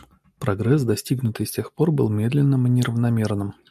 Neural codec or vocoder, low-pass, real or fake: none; 14.4 kHz; real